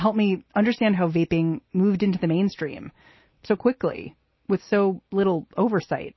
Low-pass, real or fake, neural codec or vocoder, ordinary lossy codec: 7.2 kHz; real; none; MP3, 24 kbps